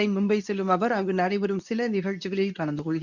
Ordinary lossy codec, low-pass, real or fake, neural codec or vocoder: none; 7.2 kHz; fake; codec, 24 kHz, 0.9 kbps, WavTokenizer, medium speech release version 2